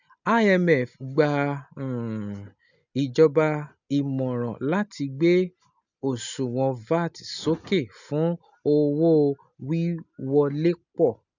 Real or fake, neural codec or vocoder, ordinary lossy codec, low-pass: real; none; none; 7.2 kHz